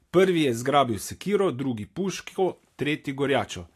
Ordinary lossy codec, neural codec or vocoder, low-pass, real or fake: AAC, 64 kbps; none; 14.4 kHz; real